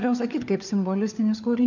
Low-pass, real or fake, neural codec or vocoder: 7.2 kHz; fake; codec, 16 kHz, 4 kbps, FunCodec, trained on LibriTTS, 50 frames a second